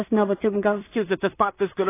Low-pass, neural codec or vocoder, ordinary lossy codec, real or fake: 3.6 kHz; codec, 16 kHz in and 24 kHz out, 0.4 kbps, LongCat-Audio-Codec, two codebook decoder; AAC, 16 kbps; fake